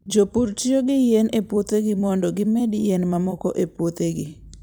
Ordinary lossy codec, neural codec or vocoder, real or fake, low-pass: none; vocoder, 44.1 kHz, 128 mel bands every 256 samples, BigVGAN v2; fake; none